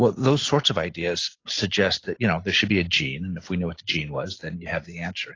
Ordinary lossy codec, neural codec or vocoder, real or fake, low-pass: AAC, 32 kbps; none; real; 7.2 kHz